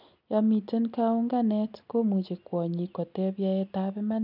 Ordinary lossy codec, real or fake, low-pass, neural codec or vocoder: none; real; 5.4 kHz; none